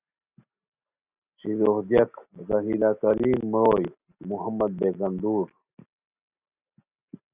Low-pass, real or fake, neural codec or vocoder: 3.6 kHz; real; none